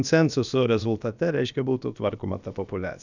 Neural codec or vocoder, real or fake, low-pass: codec, 16 kHz, about 1 kbps, DyCAST, with the encoder's durations; fake; 7.2 kHz